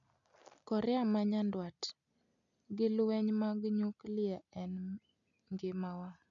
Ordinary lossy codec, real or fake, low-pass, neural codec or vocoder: none; real; 7.2 kHz; none